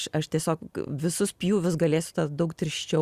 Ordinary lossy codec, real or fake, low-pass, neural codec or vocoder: Opus, 64 kbps; real; 14.4 kHz; none